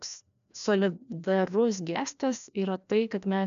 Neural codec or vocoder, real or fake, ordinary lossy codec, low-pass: codec, 16 kHz, 1 kbps, FreqCodec, larger model; fake; MP3, 96 kbps; 7.2 kHz